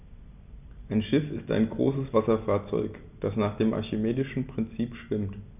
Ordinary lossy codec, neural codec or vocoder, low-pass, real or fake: none; none; 3.6 kHz; real